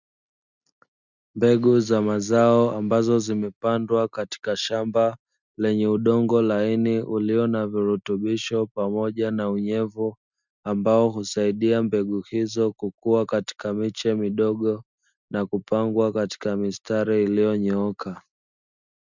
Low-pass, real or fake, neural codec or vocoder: 7.2 kHz; real; none